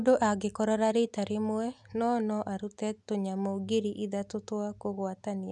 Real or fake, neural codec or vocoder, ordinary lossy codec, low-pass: real; none; none; 10.8 kHz